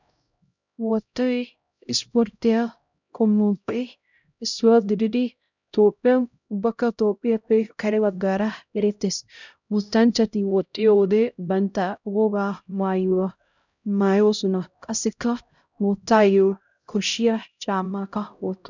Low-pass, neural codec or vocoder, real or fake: 7.2 kHz; codec, 16 kHz, 0.5 kbps, X-Codec, HuBERT features, trained on LibriSpeech; fake